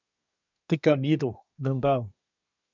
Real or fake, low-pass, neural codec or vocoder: fake; 7.2 kHz; codec, 24 kHz, 1 kbps, SNAC